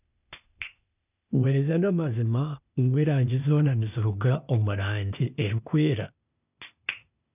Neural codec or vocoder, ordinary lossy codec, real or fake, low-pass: codec, 16 kHz, 0.8 kbps, ZipCodec; none; fake; 3.6 kHz